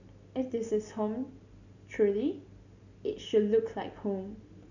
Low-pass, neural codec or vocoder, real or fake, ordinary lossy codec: 7.2 kHz; none; real; none